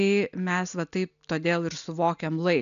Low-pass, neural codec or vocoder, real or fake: 7.2 kHz; none; real